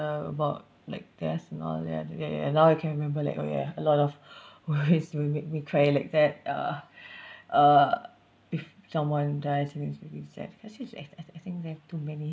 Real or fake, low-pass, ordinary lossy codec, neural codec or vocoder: real; none; none; none